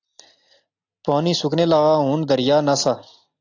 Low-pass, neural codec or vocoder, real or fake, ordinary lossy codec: 7.2 kHz; none; real; AAC, 48 kbps